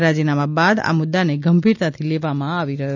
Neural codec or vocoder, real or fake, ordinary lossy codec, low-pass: none; real; none; 7.2 kHz